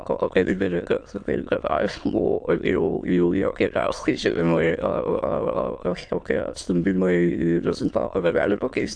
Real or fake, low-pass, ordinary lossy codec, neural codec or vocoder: fake; 9.9 kHz; AAC, 64 kbps; autoencoder, 22.05 kHz, a latent of 192 numbers a frame, VITS, trained on many speakers